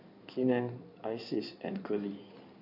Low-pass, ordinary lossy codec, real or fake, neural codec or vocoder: 5.4 kHz; none; fake; codec, 16 kHz in and 24 kHz out, 2.2 kbps, FireRedTTS-2 codec